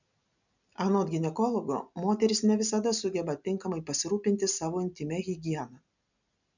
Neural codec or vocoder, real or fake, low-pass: none; real; 7.2 kHz